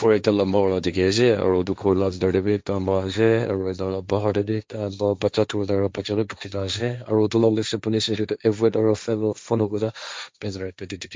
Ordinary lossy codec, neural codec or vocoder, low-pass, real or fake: none; codec, 16 kHz, 1.1 kbps, Voila-Tokenizer; none; fake